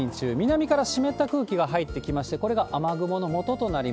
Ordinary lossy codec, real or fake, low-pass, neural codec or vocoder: none; real; none; none